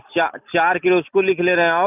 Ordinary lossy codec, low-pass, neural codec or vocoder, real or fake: none; 3.6 kHz; none; real